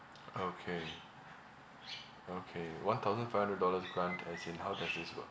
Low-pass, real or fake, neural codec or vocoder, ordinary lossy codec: none; real; none; none